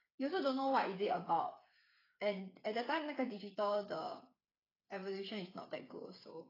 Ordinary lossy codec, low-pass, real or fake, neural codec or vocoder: AAC, 24 kbps; 5.4 kHz; fake; codec, 16 kHz, 8 kbps, FreqCodec, smaller model